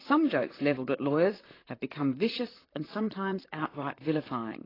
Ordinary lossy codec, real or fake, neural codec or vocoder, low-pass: AAC, 24 kbps; fake; vocoder, 44.1 kHz, 128 mel bands, Pupu-Vocoder; 5.4 kHz